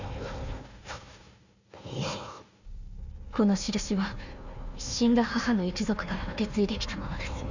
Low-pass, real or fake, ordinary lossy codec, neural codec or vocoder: 7.2 kHz; fake; none; codec, 16 kHz, 1 kbps, FunCodec, trained on Chinese and English, 50 frames a second